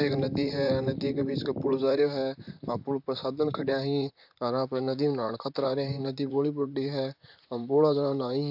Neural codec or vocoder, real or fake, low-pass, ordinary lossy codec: vocoder, 44.1 kHz, 128 mel bands, Pupu-Vocoder; fake; 5.4 kHz; AAC, 48 kbps